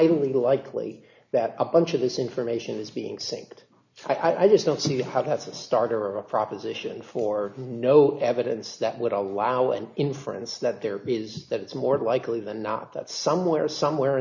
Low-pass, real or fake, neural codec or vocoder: 7.2 kHz; real; none